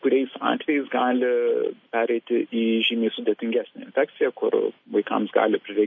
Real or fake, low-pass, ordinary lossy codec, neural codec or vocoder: real; 7.2 kHz; MP3, 32 kbps; none